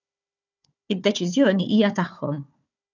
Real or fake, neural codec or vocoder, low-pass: fake; codec, 16 kHz, 4 kbps, FunCodec, trained on Chinese and English, 50 frames a second; 7.2 kHz